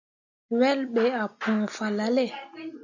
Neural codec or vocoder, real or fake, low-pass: none; real; 7.2 kHz